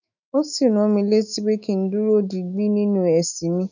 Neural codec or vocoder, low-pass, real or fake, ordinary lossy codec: autoencoder, 48 kHz, 128 numbers a frame, DAC-VAE, trained on Japanese speech; 7.2 kHz; fake; none